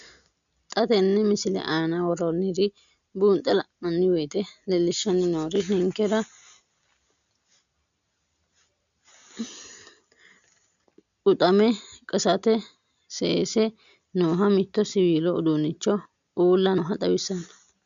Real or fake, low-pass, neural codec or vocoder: real; 7.2 kHz; none